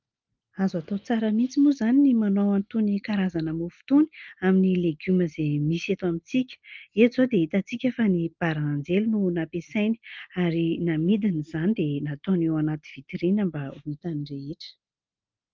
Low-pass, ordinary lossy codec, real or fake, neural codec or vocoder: 7.2 kHz; Opus, 24 kbps; real; none